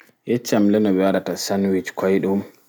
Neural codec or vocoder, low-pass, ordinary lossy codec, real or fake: none; none; none; real